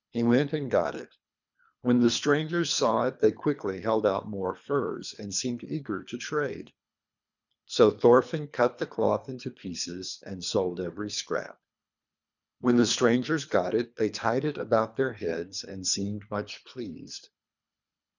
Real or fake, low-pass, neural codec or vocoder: fake; 7.2 kHz; codec, 24 kHz, 3 kbps, HILCodec